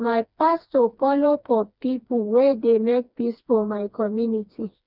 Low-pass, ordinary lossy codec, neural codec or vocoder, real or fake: 5.4 kHz; none; codec, 16 kHz, 2 kbps, FreqCodec, smaller model; fake